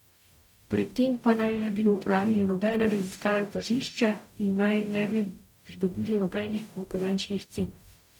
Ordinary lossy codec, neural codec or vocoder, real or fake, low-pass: none; codec, 44.1 kHz, 0.9 kbps, DAC; fake; 19.8 kHz